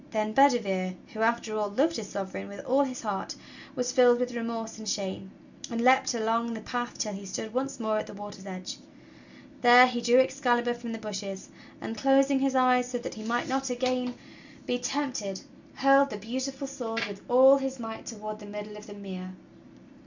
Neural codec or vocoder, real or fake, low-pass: none; real; 7.2 kHz